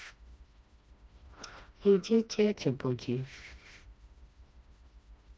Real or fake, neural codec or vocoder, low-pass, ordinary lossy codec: fake; codec, 16 kHz, 1 kbps, FreqCodec, smaller model; none; none